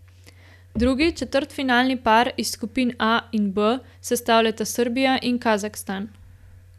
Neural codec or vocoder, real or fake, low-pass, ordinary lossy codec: none; real; 14.4 kHz; none